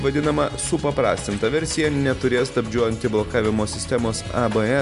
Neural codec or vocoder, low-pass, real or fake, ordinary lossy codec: none; 10.8 kHz; real; MP3, 64 kbps